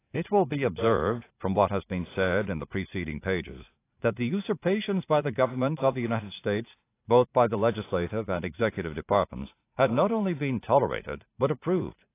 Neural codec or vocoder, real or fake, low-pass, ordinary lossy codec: vocoder, 44.1 kHz, 80 mel bands, Vocos; fake; 3.6 kHz; AAC, 24 kbps